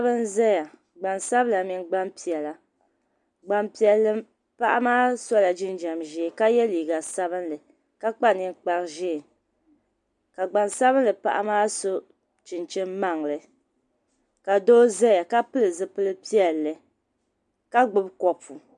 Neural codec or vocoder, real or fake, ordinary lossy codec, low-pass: none; real; MP3, 96 kbps; 10.8 kHz